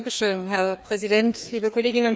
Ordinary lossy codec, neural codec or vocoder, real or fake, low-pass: none; codec, 16 kHz, 2 kbps, FreqCodec, larger model; fake; none